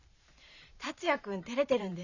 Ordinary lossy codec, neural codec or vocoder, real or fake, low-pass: none; vocoder, 44.1 kHz, 128 mel bands every 512 samples, BigVGAN v2; fake; 7.2 kHz